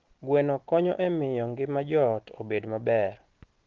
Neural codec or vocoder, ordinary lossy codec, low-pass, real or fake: none; Opus, 16 kbps; 7.2 kHz; real